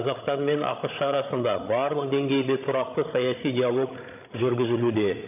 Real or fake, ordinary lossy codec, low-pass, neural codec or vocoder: fake; AAC, 32 kbps; 3.6 kHz; codec, 16 kHz, 16 kbps, FreqCodec, larger model